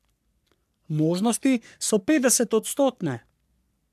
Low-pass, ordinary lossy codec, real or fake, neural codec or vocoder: 14.4 kHz; none; fake; codec, 44.1 kHz, 3.4 kbps, Pupu-Codec